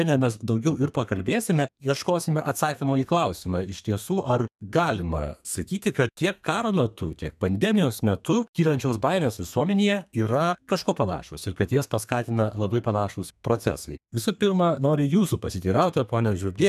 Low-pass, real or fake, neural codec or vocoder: 14.4 kHz; fake; codec, 32 kHz, 1.9 kbps, SNAC